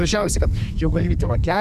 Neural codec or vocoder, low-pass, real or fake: codec, 32 kHz, 1.9 kbps, SNAC; 14.4 kHz; fake